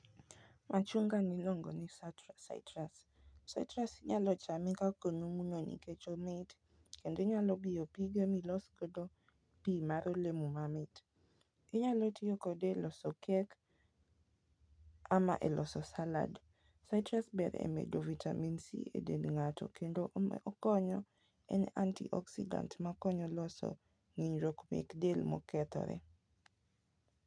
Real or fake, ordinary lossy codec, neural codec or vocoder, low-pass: real; none; none; 9.9 kHz